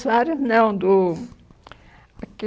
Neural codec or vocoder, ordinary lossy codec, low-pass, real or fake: none; none; none; real